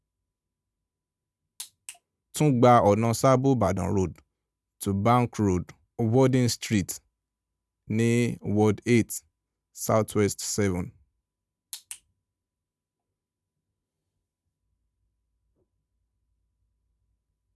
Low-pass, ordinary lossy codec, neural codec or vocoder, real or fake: none; none; none; real